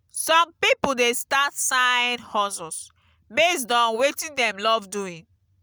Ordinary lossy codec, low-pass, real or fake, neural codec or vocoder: none; none; real; none